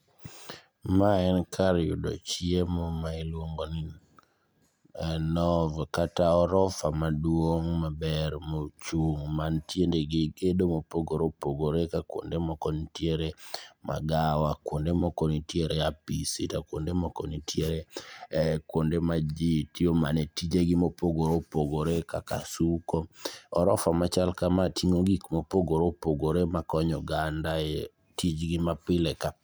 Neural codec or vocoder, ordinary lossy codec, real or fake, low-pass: none; none; real; none